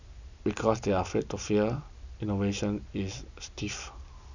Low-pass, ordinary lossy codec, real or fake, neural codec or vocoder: 7.2 kHz; none; real; none